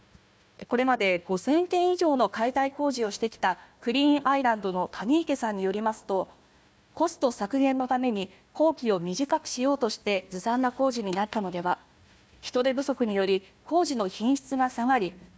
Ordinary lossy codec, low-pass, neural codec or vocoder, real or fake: none; none; codec, 16 kHz, 1 kbps, FunCodec, trained on Chinese and English, 50 frames a second; fake